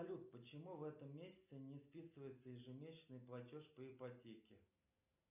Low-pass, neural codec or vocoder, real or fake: 3.6 kHz; none; real